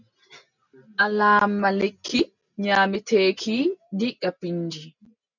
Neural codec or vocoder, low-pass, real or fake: none; 7.2 kHz; real